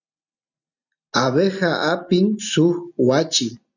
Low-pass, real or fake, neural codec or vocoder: 7.2 kHz; real; none